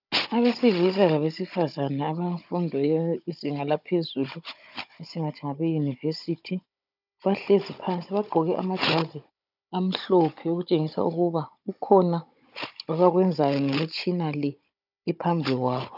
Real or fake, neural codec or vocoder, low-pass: fake; codec, 16 kHz, 16 kbps, FunCodec, trained on Chinese and English, 50 frames a second; 5.4 kHz